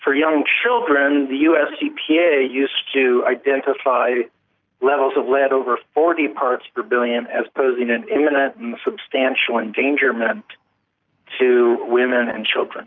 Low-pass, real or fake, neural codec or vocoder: 7.2 kHz; real; none